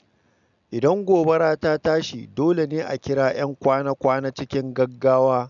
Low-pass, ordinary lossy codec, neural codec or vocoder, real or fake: 7.2 kHz; none; none; real